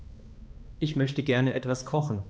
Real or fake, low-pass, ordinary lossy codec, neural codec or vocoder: fake; none; none; codec, 16 kHz, 2 kbps, X-Codec, HuBERT features, trained on balanced general audio